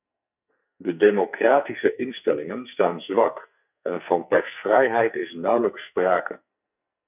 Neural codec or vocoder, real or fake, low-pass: codec, 44.1 kHz, 2.6 kbps, SNAC; fake; 3.6 kHz